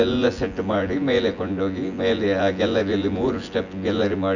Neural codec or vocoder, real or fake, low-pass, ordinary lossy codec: vocoder, 24 kHz, 100 mel bands, Vocos; fake; 7.2 kHz; none